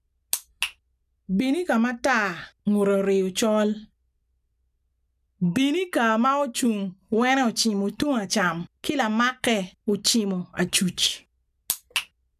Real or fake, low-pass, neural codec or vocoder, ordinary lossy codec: real; 14.4 kHz; none; none